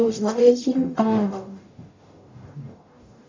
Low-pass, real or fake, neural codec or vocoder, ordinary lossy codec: 7.2 kHz; fake; codec, 44.1 kHz, 0.9 kbps, DAC; MP3, 64 kbps